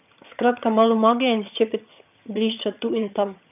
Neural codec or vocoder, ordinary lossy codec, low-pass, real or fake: vocoder, 22.05 kHz, 80 mel bands, HiFi-GAN; none; 3.6 kHz; fake